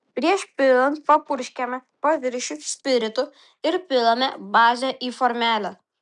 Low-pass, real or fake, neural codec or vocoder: 10.8 kHz; real; none